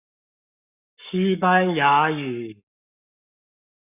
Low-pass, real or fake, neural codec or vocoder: 3.6 kHz; fake; codec, 44.1 kHz, 7.8 kbps, Pupu-Codec